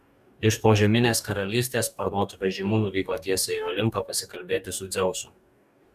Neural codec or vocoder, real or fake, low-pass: codec, 44.1 kHz, 2.6 kbps, DAC; fake; 14.4 kHz